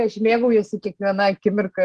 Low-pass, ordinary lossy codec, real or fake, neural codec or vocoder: 10.8 kHz; Opus, 16 kbps; real; none